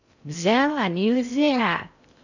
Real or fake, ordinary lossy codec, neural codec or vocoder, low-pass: fake; none; codec, 16 kHz in and 24 kHz out, 0.6 kbps, FocalCodec, streaming, 4096 codes; 7.2 kHz